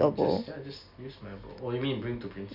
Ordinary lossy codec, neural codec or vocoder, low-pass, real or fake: none; none; 5.4 kHz; real